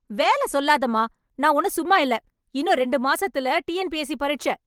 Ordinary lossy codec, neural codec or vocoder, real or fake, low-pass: Opus, 24 kbps; vocoder, 44.1 kHz, 128 mel bands every 512 samples, BigVGAN v2; fake; 19.8 kHz